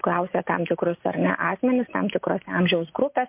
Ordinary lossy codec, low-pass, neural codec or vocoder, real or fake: MP3, 32 kbps; 3.6 kHz; none; real